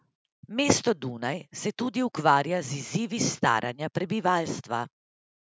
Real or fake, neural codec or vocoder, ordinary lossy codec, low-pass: real; none; none; none